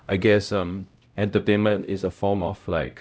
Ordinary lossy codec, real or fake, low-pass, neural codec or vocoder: none; fake; none; codec, 16 kHz, 0.5 kbps, X-Codec, HuBERT features, trained on LibriSpeech